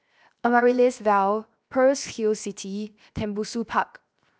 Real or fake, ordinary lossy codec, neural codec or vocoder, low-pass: fake; none; codec, 16 kHz, 0.7 kbps, FocalCodec; none